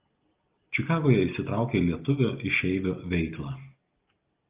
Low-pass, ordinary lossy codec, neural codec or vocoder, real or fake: 3.6 kHz; Opus, 32 kbps; none; real